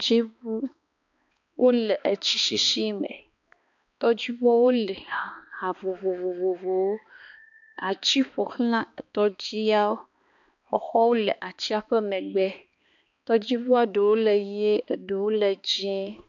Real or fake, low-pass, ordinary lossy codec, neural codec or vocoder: fake; 7.2 kHz; AAC, 64 kbps; codec, 16 kHz, 2 kbps, X-Codec, HuBERT features, trained on balanced general audio